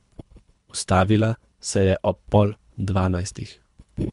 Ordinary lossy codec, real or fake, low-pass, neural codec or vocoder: MP3, 64 kbps; fake; 10.8 kHz; codec, 24 kHz, 3 kbps, HILCodec